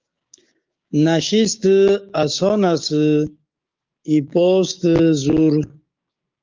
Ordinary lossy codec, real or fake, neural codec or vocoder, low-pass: Opus, 24 kbps; fake; codec, 16 kHz, 6 kbps, DAC; 7.2 kHz